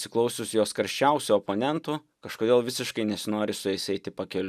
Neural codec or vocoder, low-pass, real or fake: none; 14.4 kHz; real